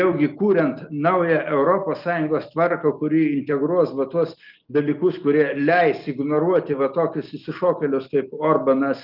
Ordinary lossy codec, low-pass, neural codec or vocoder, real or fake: Opus, 32 kbps; 5.4 kHz; none; real